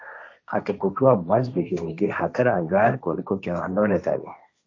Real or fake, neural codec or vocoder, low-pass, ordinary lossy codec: fake; codec, 16 kHz, 1.1 kbps, Voila-Tokenizer; 7.2 kHz; AAC, 48 kbps